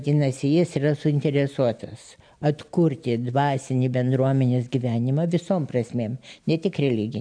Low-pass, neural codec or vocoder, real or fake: 9.9 kHz; none; real